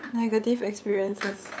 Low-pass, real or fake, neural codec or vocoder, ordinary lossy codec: none; fake; codec, 16 kHz, 8 kbps, FunCodec, trained on LibriTTS, 25 frames a second; none